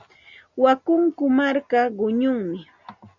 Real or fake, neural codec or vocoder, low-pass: real; none; 7.2 kHz